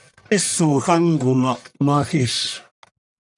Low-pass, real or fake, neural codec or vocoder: 10.8 kHz; fake; codec, 44.1 kHz, 1.7 kbps, Pupu-Codec